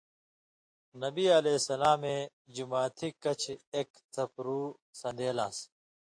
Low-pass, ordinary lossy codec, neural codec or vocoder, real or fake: 9.9 kHz; AAC, 48 kbps; none; real